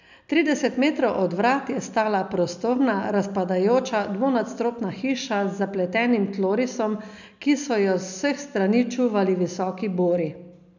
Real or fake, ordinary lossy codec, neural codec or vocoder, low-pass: real; none; none; 7.2 kHz